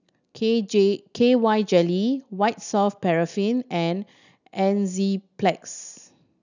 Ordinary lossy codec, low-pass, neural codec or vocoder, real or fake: none; 7.2 kHz; none; real